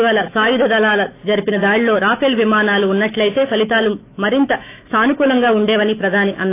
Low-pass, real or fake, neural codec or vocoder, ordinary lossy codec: 3.6 kHz; fake; autoencoder, 48 kHz, 128 numbers a frame, DAC-VAE, trained on Japanese speech; AAC, 24 kbps